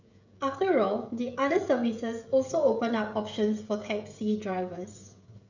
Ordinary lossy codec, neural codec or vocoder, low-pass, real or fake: none; codec, 16 kHz, 16 kbps, FreqCodec, smaller model; 7.2 kHz; fake